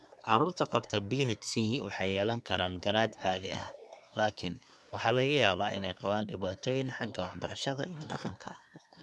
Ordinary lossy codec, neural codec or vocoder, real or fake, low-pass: none; codec, 24 kHz, 1 kbps, SNAC; fake; none